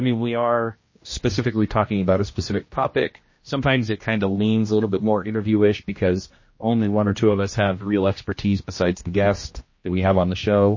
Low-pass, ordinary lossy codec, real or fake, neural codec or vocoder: 7.2 kHz; MP3, 32 kbps; fake; codec, 16 kHz, 1 kbps, X-Codec, HuBERT features, trained on general audio